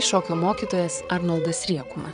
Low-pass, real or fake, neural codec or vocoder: 9.9 kHz; fake; vocoder, 22.05 kHz, 80 mel bands, Vocos